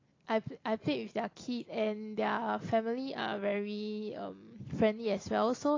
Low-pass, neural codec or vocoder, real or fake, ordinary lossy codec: 7.2 kHz; none; real; AAC, 32 kbps